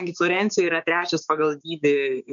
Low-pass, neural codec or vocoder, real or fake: 7.2 kHz; codec, 16 kHz, 6 kbps, DAC; fake